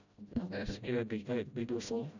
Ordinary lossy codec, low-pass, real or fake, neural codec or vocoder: none; 7.2 kHz; fake; codec, 16 kHz, 0.5 kbps, FreqCodec, smaller model